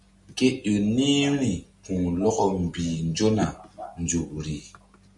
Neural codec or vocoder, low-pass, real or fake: none; 10.8 kHz; real